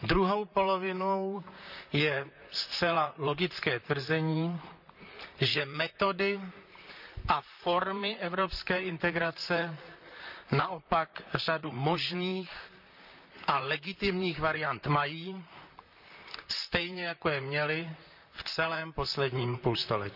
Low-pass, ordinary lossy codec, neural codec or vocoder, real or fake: 5.4 kHz; none; vocoder, 44.1 kHz, 128 mel bands, Pupu-Vocoder; fake